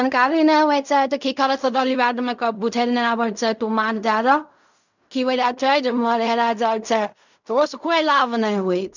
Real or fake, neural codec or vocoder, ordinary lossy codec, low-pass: fake; codec, 16 kHz in and 24 kHz out, 0.4 kbps, LongCat-Audio-Codec, fine tuned four codebook decoder; none; 7.2 kHz